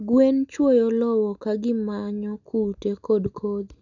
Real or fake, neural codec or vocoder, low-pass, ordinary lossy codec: real; none; 7.2 kHz; none